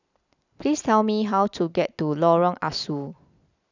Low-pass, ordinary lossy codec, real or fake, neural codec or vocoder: 7.2 kHz; none; real; none